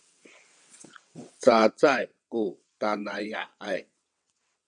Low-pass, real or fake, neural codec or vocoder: 9.9 kHz; fake; vocoder, 22.05 kHz, 80 mel bands, WaveNeXt